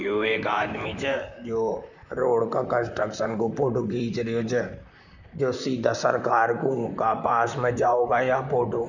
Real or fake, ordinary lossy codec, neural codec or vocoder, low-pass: fake; none; vocoder, 44.1 kHz, 128 mel bands, Pupu-Vocoder; 7.2 kHz